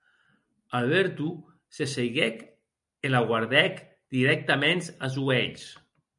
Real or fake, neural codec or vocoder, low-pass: real; none; 10.8 kHz